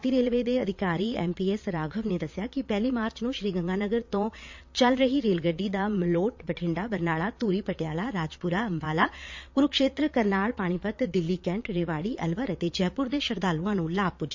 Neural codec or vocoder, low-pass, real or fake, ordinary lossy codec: vocoder, 22.05 kHz, 80 mel bands, Vocos; 7.2 kHz; fake; MP3, 48 kbps